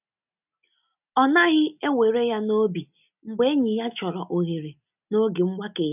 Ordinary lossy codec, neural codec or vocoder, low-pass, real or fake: none; none; 3.6 kHz; real